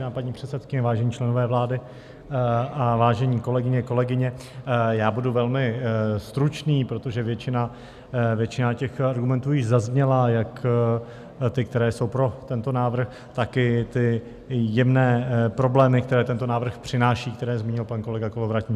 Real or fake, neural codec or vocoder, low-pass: real; none; 14.4 kHz